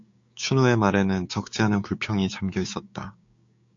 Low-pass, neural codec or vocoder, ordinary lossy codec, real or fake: 7.2 kHz; codec, 16 kHz, 6 kbps, DAC; AAC, 48 kbps; fake